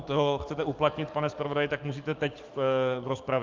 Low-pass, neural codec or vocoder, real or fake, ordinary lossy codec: 7.2 kHz; codec, 44.1 kHz, 7.8 kbps, DAC; fake; Opus, 32 kbps